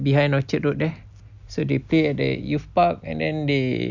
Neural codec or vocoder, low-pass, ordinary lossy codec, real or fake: none; 7.2 kHz; none; real